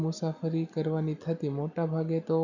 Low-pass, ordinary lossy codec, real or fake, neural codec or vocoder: 7.2 kHz; none; real; none